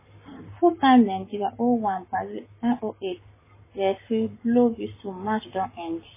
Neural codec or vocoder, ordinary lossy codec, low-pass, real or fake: codec, 44.1 kHz, 7.8 kbps, Pupu-Codec; MP3, 16 kbps; 3.6 kHz; fake